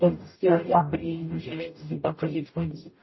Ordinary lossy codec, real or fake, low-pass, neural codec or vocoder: MP3, 24 kbps; fake; 7.2 kHz; codec, 44.1 kHz, 0.9 kbps, DAC